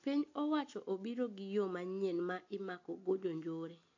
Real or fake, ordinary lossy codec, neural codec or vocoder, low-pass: real; AAC, 48 kbps; none; 7.2 kHz